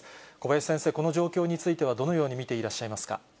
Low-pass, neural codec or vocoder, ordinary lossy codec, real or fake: none; none; none; real